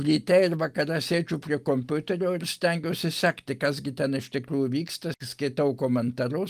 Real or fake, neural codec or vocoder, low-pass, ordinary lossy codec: real; none; 14.4 kHz; Opus, 32 kbps